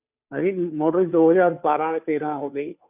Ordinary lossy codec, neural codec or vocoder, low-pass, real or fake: none; codec, 16 kHz, 2 kbps, FunCodec, trained on Chinese and English, 25 frames a second; 3.6 kHz; fake